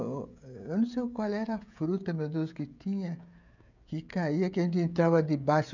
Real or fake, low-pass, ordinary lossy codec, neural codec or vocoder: fake; 7.2 kHz; none; codec, 16 kHz, 16 kbps, FreqCodec, smaller model